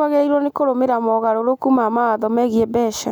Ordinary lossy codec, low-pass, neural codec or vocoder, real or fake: none; none; none; real